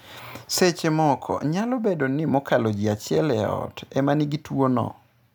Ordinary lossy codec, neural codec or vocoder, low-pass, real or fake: none; none; none; real